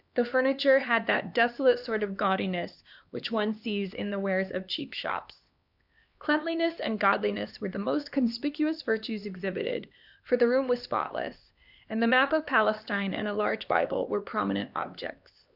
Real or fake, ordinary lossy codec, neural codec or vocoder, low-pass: fake; Opus, 64 kbps; codec, 16 kHz, 2 kbps, X-Codec, HuBERT features, trained on LibriSpeech; 5.4 kHz